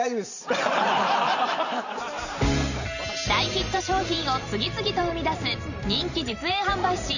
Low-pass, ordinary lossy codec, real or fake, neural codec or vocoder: 7.2 kHz; none; fake; vocoder, 44.1 kHz, 128 mel bands every 512 samples, BigVGAN v2